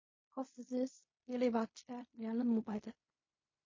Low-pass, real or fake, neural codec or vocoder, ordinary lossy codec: 7.2 kHz; fake; codec, 16 kHz in and 24 kHz out, 0.4 kbps, LongCat-Audio-Codec, fine tuned four codebook decoder; MP3, 32 kbps